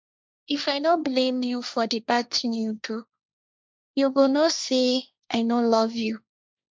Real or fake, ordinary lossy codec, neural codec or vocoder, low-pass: fake; none; codec, 16 kHz, 1.1 kbps, Voila-Tokenizer; none